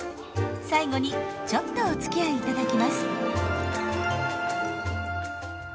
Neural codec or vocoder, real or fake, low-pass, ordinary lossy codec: none; real; none; none